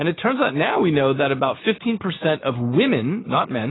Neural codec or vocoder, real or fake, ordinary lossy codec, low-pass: codec, 16 kHz, 6 kbps, DAC; fake; AAC, 16 kbps; 7.2 kHz